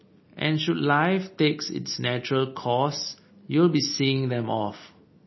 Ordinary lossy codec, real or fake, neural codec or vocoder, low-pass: MP3, 24 kbps; real; none; 7.2 kHz